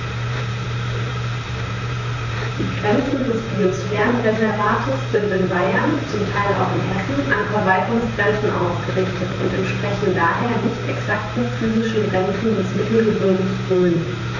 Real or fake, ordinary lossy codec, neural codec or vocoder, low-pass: fake; none; vocoder, 44.1 kHz, 128 mel bands, Pupu-Vocoder; 7.2 kHz